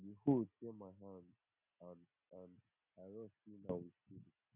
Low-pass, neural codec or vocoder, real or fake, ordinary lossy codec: 3.6 kHz; none; real; none